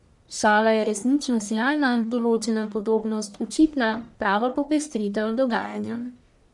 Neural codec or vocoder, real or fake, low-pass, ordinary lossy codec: codec, 44.1 kHz, 1.7 kbps, Pupu-Codec; fake; 10.8 kHz; none